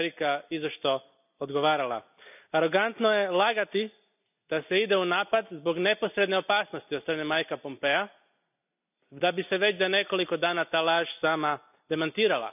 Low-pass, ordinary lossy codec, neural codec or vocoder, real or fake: 3.6 kHz; none; none; real